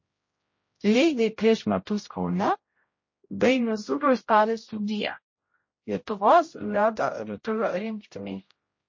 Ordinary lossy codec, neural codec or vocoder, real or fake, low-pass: MP3, 32 kbps; codec, 16 kHz, 0.5 kbps, X-Codec, HuBERT features, trained on general audio; fake; 7.2 kHz